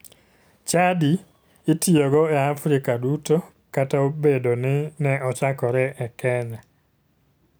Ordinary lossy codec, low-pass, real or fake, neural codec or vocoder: none; none; real; none